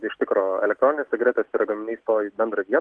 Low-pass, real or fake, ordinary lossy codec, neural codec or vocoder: 10.8 kHz; real; Opus, 16 kbps; none